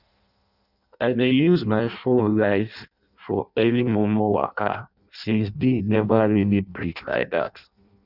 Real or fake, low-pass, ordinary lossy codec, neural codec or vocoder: fake; 5.4 kHz; none; codec, 16 kHz in and 24 kHz out, 0.6 kbps, FireRedTTS-2 codec